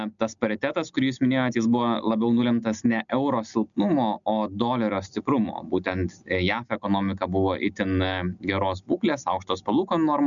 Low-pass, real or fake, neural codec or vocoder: 7.2 kHz; real; none